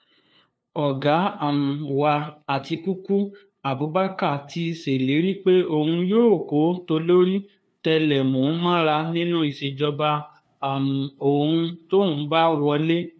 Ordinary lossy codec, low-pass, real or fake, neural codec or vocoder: none; none; fake; codec, 16 kHz, 2 kbps, FunCodec, trained on LibriTTS, 25 frames a second